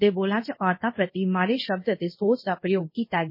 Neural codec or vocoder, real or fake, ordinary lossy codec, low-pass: codec, 24 kHz, 0.5 kbps, DualCodec; fake; MP3, 24 kbps; 5.4 kHz